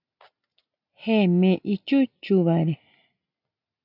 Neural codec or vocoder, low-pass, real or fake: none; 5.4 kHz; real